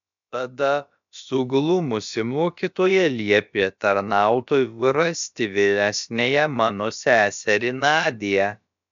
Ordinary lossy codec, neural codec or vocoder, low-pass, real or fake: MP3, 64 kbps; codec, 16 kHz, about 1 kbps, DyCAST, with the encoder's durations; 7.2 kHz; fake